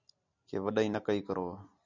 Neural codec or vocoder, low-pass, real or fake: none; 7.2 kHz; real